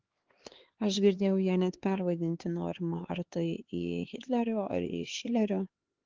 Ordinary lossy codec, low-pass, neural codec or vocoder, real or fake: Opus, 16 kbps; 7.2 kHz; codec, 16 kHz, 4 kbps, X-Codec, HuBERT features, trained on LibriSpeech; fake